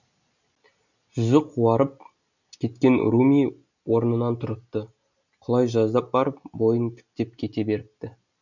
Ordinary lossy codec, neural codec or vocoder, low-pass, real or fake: none; none; 7.2 kHz; real